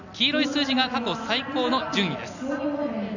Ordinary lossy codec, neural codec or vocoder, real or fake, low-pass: none; none; real; 7.2 kHz